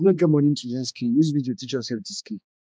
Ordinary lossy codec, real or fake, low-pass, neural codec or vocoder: none; fake; none; codec, 16 kHz, 2 kbps, X-Codec, HuBERT features, trained on balanced general audio